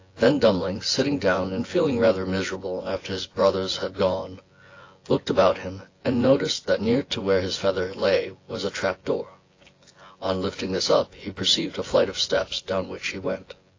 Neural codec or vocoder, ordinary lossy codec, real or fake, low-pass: vocoder, 24 kHz, 100 mel bands, Vocos; AAC, 32 kbps; fake; 7.2 kHz